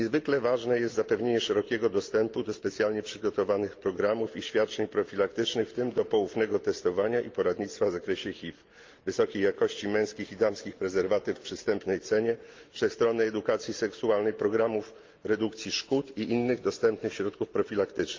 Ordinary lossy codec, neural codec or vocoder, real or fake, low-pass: Opus, 24 kbps; none; real; 7.2 kHz